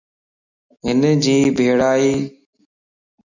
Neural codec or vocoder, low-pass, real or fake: none; 7.2 kHz; real